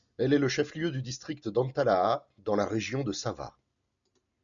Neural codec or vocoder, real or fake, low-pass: none; real; 7.2 kHz